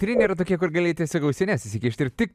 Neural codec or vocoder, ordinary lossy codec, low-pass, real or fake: none; MP3, 96 kbps; 14.4 kHz; real